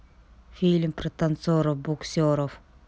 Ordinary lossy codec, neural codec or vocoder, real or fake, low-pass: none; none; real; none